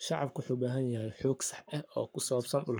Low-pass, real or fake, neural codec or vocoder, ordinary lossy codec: none; fake; codec, 44.1 kHz, 7.8 kbps, Pupu-Codec; none